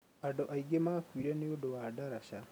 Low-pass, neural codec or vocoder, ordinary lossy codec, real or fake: none; none; none; real